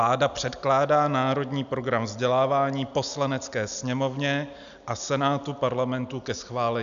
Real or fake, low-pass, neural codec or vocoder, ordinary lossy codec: real; 7.2 kHz; none; AAC, 96 kbps